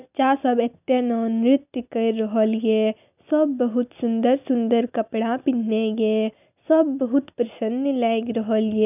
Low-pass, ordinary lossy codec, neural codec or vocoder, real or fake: 3.6 kHz; none; none; real